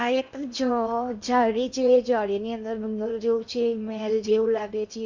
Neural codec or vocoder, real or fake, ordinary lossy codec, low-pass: codec, 16 kHz in and 24 kHz out, 0.8 kbps, FocalCodec, streaming, 65536 codes; fake; MP3, 64 kbps; 7.2 kHz